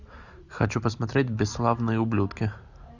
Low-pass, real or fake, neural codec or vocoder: 7.2 kHz; real; none